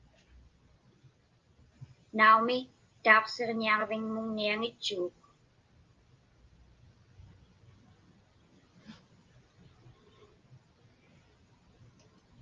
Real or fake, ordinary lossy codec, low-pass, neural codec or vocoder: real; Opus, 32 kbps; 7.2 kHz; none